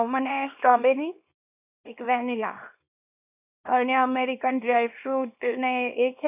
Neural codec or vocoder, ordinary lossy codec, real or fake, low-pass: codec, 24 kHz, 0.9 kbps, WavTokenizer, small release; none; fake; 3.6 kHz